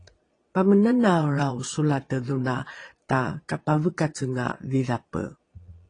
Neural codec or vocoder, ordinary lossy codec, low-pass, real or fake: vocoder, 22.05 kHz, 80 mel bands, Vocos; AAC, 32 kbps; 9.9 kHz; fake